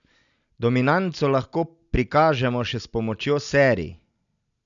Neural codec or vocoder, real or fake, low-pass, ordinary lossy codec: none; real; 7.2 kHz; none